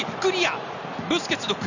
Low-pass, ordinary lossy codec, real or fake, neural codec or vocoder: 7.2 kHz; none; real; none